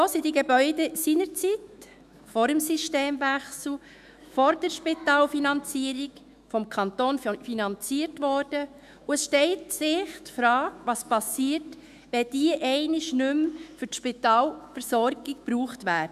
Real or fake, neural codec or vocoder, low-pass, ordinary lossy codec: fake; autoencoder, 48 kHz, 128 numbers a frame, DAC-VAE, trained on Japanese speech; 14.4 kHz; none